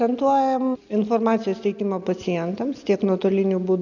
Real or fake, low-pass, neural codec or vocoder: real; 7.2 kHz; none